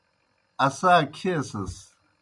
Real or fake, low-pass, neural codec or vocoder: real; 10.8 kHz; none